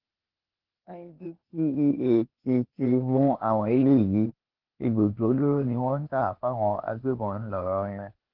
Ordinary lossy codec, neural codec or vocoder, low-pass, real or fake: Opus, 16 kbps; codec, 16 kHz, 0.8 kbps, ZipCodec; 5.4 kHz; fake